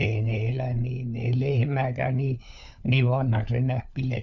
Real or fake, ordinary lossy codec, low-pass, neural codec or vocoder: fake; MP3, 96 kbps; 7.2 kHz; codec, 16 kHz, 4 kbps, FunCodec, trained on LibriTTS, 50 frames a second